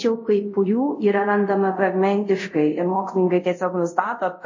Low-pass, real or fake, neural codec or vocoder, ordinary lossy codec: 7.2 kHz; fake; codec, 24 kHz, 0.5 kbps, DualCodec; MP3, 32 kbps